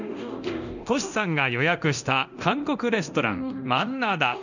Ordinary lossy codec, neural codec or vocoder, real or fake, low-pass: none; codec, 24 kHz, 0.9 kbps, DualCodec; fake; 7.2 kHz